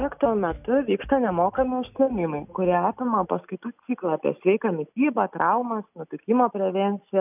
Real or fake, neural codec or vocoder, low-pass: real; none; 3.6 kHz